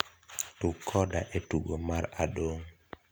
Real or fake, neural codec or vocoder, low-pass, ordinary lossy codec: real; none; none; none